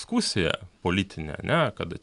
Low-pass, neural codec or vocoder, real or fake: 10.8 kHz; none; real